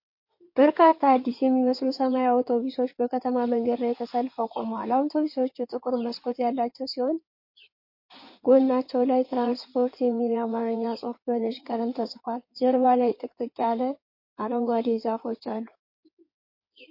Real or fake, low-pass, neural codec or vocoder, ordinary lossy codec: fake; 5.4 kHz; codec, 16 kHz in and 24 kHz out, 2.2 kbps, FireRedTTS-2 codec; MP3, 32 kbps